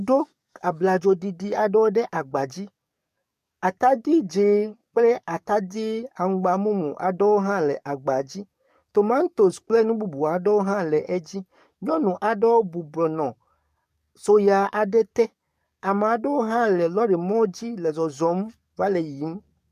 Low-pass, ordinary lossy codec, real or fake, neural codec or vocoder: 14.4 kHz; MP3, 96 kbps; fake; codec, 44.1 kHz, 7.8 kbps, DAC